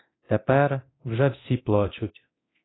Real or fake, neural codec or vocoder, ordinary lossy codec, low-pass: fake; codec, 24 kHz, 0.9 kbps, DualCodec; AAC, 16 kbps; 7.2 kHz